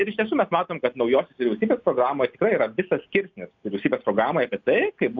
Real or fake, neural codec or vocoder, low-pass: real; none; 7.2 kHz